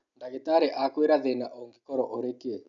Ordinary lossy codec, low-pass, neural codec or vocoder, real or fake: none; 7.2 kHz; none; real